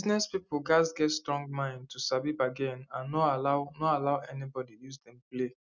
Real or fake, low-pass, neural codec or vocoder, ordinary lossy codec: real; 7.2 kHz; none; none